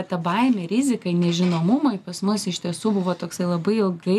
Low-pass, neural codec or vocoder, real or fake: 14.4 kHz; none; real